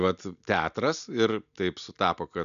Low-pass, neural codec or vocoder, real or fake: 7.2 kHz; none; real